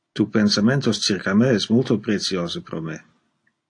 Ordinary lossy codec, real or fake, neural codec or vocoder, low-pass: AAC, 48 kbps; real; none; 9.9 kHz